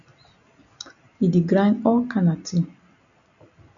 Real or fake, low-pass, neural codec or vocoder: real; 7.2 kHz; none